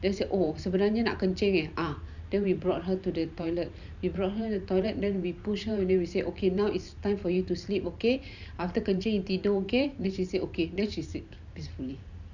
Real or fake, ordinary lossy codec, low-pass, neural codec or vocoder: fake; none; 7.2 kHz; vocoder, 44.1 kHz, 128 mel bands every 512 samples, BigVGAN v2